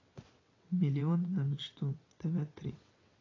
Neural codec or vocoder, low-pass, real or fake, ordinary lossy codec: vocoder, 22.05 kHz, 80 mel bands, WaveNeXt; 7.2 kHz; fake; AAC, 32 kbps